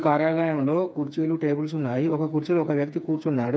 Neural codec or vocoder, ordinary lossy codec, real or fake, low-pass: codec, 16 kHz, 4 kbps, FreqCodec, smaller model; none; fake; none